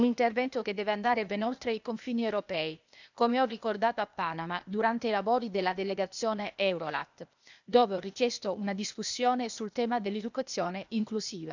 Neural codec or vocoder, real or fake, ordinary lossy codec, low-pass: codec, 16 kHz, 0.8 kbps, ZipCodec; fake; none; 7.2 kHz